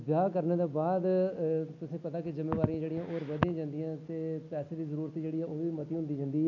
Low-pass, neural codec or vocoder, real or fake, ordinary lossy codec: 7.2 kHz; none; real; none